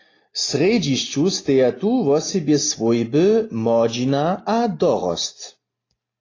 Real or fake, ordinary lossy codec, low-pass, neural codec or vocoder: real; AAC, 32 kbps; 7.2 kHz; none